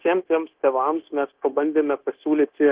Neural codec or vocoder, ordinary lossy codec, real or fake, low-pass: codec, 16 kHz, 0.9 kbps, LongCat-Audio-Codec; Opus, 16 kbps; fake; 3.6 kHz